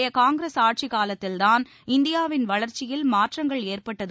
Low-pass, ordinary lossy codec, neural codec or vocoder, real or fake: none; none; none; real